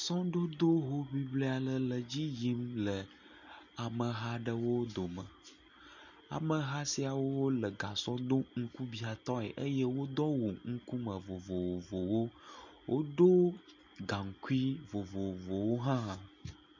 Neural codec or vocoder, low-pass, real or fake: none; 7.2 kHz; real